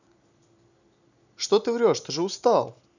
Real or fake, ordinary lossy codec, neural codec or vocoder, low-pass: real; none; none; 7.2 kHz